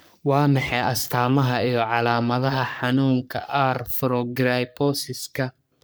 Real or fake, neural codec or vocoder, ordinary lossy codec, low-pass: fake; codec, 44.1 kHz, 3.4 kbps, Pupu-Codec; none; none